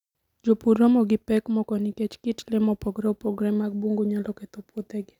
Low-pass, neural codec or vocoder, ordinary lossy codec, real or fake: 19.8 kHz; none; none; real